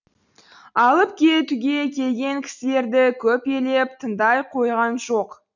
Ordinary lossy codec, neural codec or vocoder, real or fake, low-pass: none; none; real; 7.2 kHz